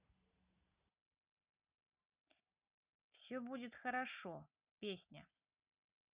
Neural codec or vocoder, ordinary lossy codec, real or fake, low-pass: none; Opus, 64 kbps; real; 3.6 kHz